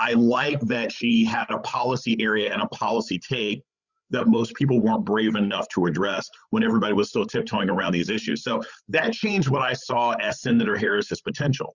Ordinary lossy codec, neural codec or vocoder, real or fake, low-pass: Opus, 64 kbps; codec, 16 kHz, 8 kbps, FreqCodec, larger model; fake; 7.2 kHz